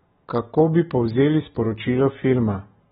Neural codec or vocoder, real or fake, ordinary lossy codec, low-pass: none; real; AAC, 16 kbps; 10.8 kHz